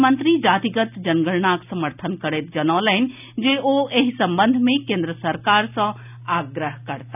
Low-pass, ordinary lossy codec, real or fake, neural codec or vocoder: 3.6 kHz; none; real; none